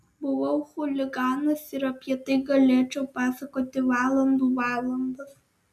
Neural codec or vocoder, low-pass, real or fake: none; 14.4 kHz; real